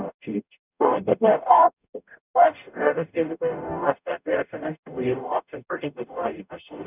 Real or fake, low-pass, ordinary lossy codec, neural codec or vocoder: fake; 3.6 kHz; none; codec, 44.1 kHz, 0.9 kbps, DAC